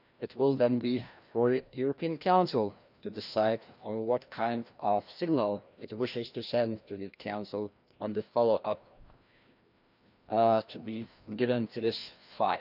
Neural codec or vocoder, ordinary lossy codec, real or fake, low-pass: codec, 16 kHz, 1 kbps, FreqCodec, larger model; none; fake; 5.4 kHz